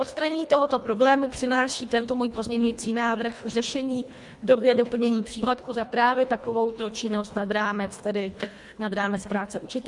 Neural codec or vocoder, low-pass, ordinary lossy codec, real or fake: codec, 24 kHz, 1.5 kbps, HILCodec; 10.8 kHz; MP3, 64 kbps; fake